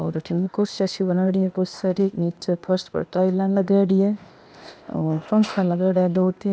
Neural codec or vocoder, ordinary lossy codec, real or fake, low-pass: codec, 16 kHz, 0.8 kbps, ZipCodec; none; fake; none